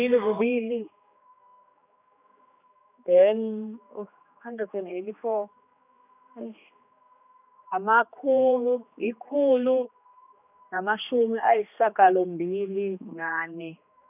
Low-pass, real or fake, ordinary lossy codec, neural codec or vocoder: 3.6 kHz; fake; none; codec, 16 kHz, 2 kbps, X-Codec, HuBERT features, trained on general audio